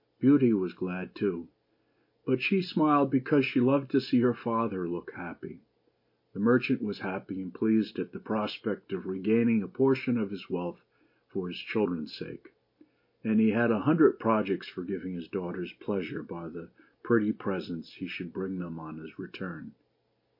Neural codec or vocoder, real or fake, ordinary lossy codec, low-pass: none; real; MP3, 32 kbps; 5.4 kHz